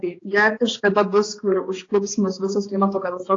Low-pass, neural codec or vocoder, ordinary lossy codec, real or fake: 7.2 kHz; codec, 16 kHz, 2 kbps, X-Codec, HuBERT features, trained on balanced general audio; AAC, 32 kbps; fake